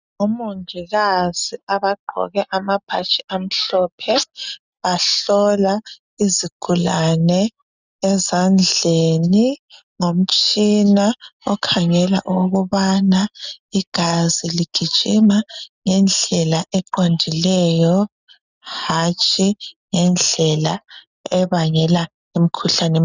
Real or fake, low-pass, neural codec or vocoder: real; 7.2 kHz; none